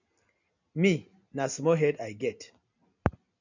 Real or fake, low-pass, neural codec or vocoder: real; 7.2 kHz; none